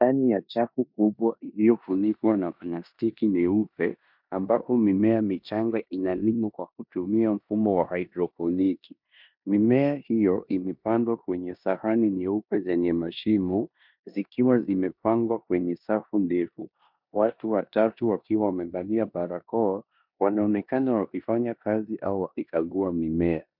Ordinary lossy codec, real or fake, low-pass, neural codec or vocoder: MP3, 48 kbps; fake; 5.4 kHz; codec, 16 kHz in and 24 kHz out, 0.9 kbps, LongCat-Audio-Codec, four codebook decoder